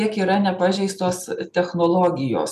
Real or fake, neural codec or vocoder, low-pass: real; none; 14.4 kHz